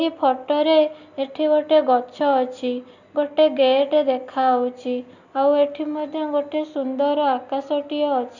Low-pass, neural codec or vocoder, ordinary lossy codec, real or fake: 7.2 kHz; none; none; real